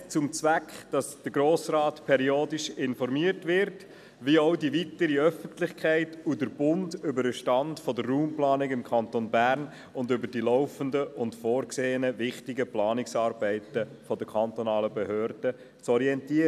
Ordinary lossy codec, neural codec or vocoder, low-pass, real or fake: none; none; 14.4 kHz; real